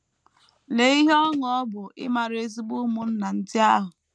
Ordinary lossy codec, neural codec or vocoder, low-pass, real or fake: none; none; none; real